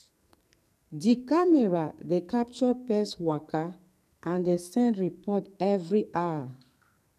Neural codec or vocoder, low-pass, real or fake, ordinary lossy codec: codec, 44.1 kHz, 2.6 kbps, SNAC; 14.4 kHz; fake; none